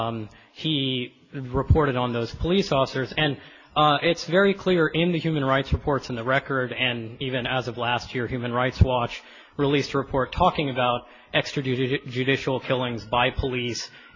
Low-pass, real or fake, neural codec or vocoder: 7.2 kHz; real; none